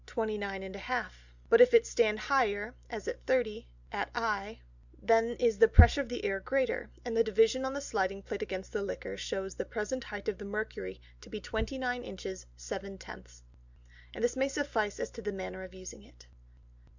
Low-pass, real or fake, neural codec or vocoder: 7.2 kHz; real; none